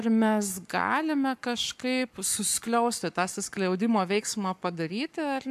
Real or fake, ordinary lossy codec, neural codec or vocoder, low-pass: fake; AAC, 96 kbps; codec, 44.1 kHz, 7.8 kbps, Pupu-Codec; 14.4 kHz